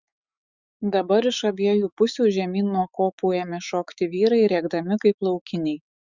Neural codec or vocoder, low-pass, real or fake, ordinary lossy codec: autoencoder, 48 kHz, 128 numbers a frame, DAC-VAE, trained on Japanese speech; 7.2 kHz; fake; Opus, 64 kbps